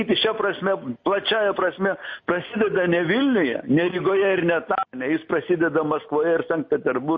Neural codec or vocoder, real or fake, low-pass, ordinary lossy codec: none; real; 7.2 kHz; MP3, 32 kbps